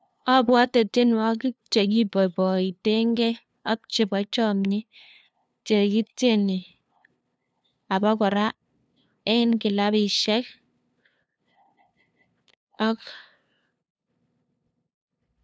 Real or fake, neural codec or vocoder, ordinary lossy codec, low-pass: fake; codec, 16 kHz, 2 kbps, FunCodec, trained on LibriTTS, 25 frames a second; none; none